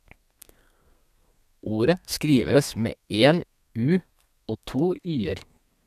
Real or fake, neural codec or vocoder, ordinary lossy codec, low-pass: fake; codec, 32 kHz, 1.9 kbps, SNAC; none; 14.4 kHz